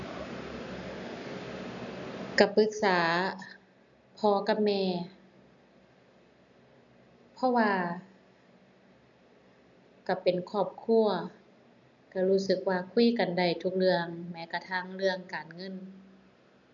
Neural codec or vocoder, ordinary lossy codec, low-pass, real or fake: none; none; 7.2 kHz; real